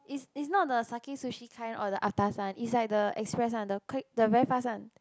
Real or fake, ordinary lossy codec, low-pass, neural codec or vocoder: real; none; none; none